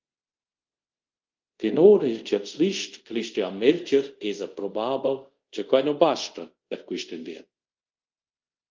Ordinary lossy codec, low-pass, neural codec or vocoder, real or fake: Opus, 32 kbps; 7.2 kHz; codec, 24 kHz, 0.5 kbps, DualCodec; fake